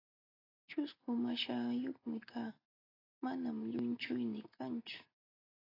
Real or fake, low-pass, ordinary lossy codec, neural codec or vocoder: real; 5.4 kHz; AAC, 24 kbps; none